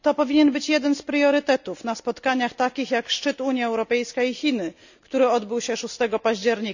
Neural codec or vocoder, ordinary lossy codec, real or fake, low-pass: none; none; real; 7.2 kHz